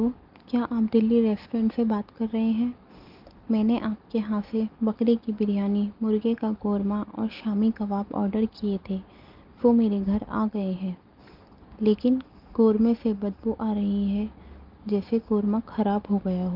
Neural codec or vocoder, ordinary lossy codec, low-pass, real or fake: none; Opus, 24 kbps; 5.4 kHz; real